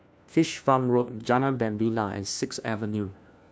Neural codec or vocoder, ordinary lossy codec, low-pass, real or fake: codec, 16 kHz, 1 kbps, FunCodec, trained on LibriTTS, 50 frames a second; none; none; fake